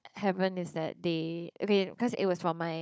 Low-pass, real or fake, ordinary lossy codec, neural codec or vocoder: none; fake; none; codec, 16 kHz, 16 kbps, FunCodec, trained on Chinese and English, 50 frames a second